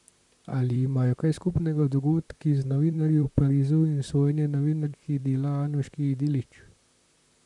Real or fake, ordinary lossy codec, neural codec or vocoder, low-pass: fake; none; vocoder, 44.1 kHz, 128 mel bands, Pupu-Vocoder; 10.8 kHz